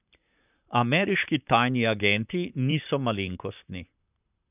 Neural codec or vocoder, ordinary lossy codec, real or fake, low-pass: vocoder, 44.1 kHz, 128 mel bands, Pupu-Vocoder; none; fake; 3.6 kHz